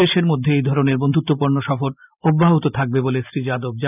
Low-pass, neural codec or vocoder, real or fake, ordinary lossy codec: 3.6 kHz; none; real; none